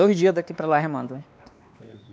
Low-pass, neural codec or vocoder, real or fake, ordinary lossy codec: none; codec, 16 kHz, 2 kbps, X-Codec, WavLM features, trained on Multilingual LibriSpeech; fake; none